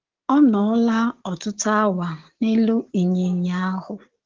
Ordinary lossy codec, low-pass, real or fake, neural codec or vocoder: Opus, 16 kbps; 7.2 kHz; fake; codec, 16 kHz, 6 kbps, DAC